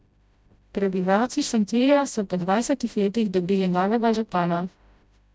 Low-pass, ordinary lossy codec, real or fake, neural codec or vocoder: none; none; fake; codec, 16 kHz, 0.5 kbps, FreqCodec, smaller model